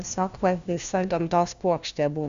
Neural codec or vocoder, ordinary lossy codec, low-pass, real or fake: codec, 16 kHz, 1 kbps, FunCodec, trained on LibriTTS, 50 frames a second; Opus, 64 kbps; 7.2 kHz; fake